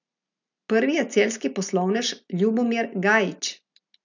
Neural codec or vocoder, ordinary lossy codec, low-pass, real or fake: none; none; 7.2 kHz; real